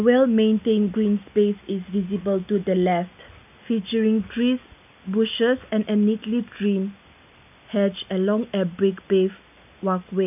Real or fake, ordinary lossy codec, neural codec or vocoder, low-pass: real; none; none; 3.6 kHz